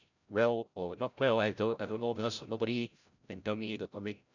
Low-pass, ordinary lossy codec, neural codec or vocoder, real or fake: 7.2 kHz; none; codec, 16 kHz, 0.5 kbps, FreqCodec, larger model; fake